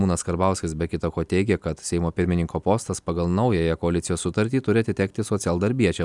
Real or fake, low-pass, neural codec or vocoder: real; 10.8 kHz; none